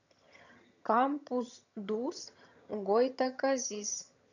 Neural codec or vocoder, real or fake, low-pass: vocoder, 22.05 kHz, 80 mel bands, HiFi-GAN; fake; 7.2 kHz